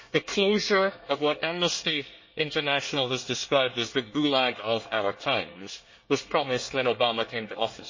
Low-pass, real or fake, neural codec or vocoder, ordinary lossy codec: 7.2 kHz; fake; codec, 24 kHz, 1 kbps, SNAC; MP3, 32 kbps